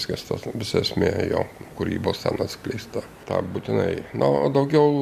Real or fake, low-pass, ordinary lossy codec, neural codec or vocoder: real; 14.4 kHz; AAC, 96 kbps; none